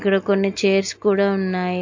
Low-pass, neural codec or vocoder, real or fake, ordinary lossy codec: 7.2 kHz; none; real; MP3, 48 kbps